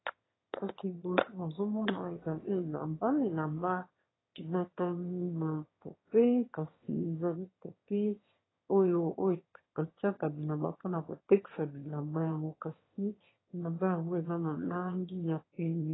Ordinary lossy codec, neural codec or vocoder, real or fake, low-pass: AAC, 16 kbps; autoencoder, 22.05 kHz, a latent of 192 numbers a frame, VITS, trained on one speaker; fake; 7.2 kHz